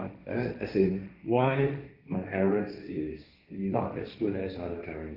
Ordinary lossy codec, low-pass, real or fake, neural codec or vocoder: none; 5.4 kHz; fake; codec, 16 kHz, 1.1 kbps, Voila-Tokenizer